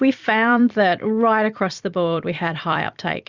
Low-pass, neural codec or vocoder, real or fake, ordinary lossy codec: 7.2 kHz; vocoder, 44.1 kHz, 128 mel bands every 256 samples, BigVGAN v2; fake; Opus, 64 kbps